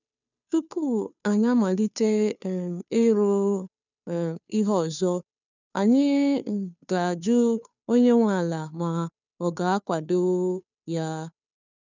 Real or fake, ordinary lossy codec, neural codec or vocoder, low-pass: fake; none; codec, 16 kHz, 2 kbps, FunCodec, trained on Chinese and English, 25 frames a second; 7.2 kHz